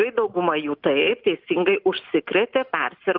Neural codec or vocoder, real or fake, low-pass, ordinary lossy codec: none; real; 5.4 kHz; Opus, 24 kbps